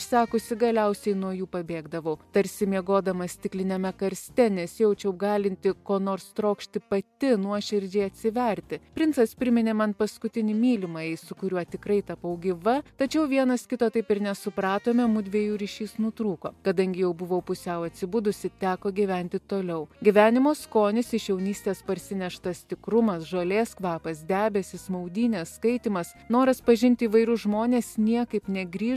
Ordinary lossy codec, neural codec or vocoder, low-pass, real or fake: MP3, 96 kbps; none; 14.4 kHz; real